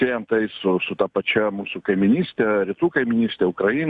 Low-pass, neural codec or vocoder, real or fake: 10.8 kHz; none; real